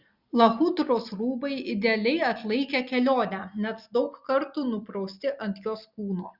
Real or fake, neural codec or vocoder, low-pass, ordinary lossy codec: real; none; 5.4 kHz; Opus, 64 kbps